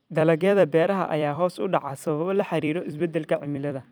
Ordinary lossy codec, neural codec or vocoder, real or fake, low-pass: none; vocoder, 44.1 kHz, 128 mel bands every 256 samples, BigVGAN v2; fake; none